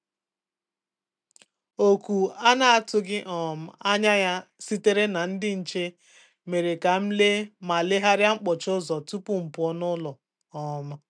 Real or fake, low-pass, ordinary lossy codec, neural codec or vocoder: real; 9.9 kHz; none; none